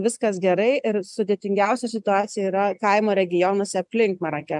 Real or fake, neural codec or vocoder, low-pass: real; none; 10.8 kHz